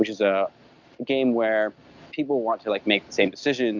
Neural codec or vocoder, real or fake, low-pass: none; real; 7.2 kHz